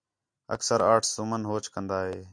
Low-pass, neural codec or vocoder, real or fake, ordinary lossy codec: 9.9 kHz; none; real; MP3, 64 kbps